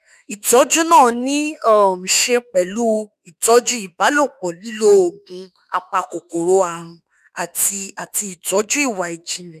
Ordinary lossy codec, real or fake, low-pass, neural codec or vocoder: none; fake; 14.4 kHz; autoencoder, 48 kHz, 32 numbers a frame, DAC-VAE, trained on Japanese speech